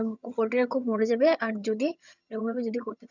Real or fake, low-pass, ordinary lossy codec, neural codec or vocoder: fake; 7.2 kHz; none; vocoder, 22.05 kHz, 80 mel bands, HiFi-GAN